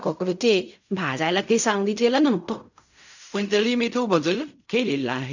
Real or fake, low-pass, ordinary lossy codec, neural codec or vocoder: fake; 7.2 kHz; none; codec, 16 kHz in and 24 kHz out, 0.4 kbps, LongCat-Audio-Codec, fine tuned four codebook decoder